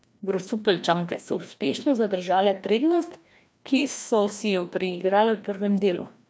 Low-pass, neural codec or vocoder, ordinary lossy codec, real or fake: none; codec, 16 kHz, 1 kbps, FreqCodec, larger model; none; fake